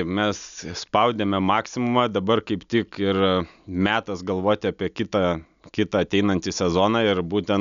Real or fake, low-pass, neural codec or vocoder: real; 7.2 kHz; none